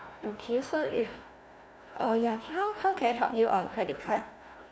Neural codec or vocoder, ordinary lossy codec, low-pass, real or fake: codec, 16 kHz, 1 kbps, FunCodec, trained on Chinese and English, 50 frames a second; none; none; fake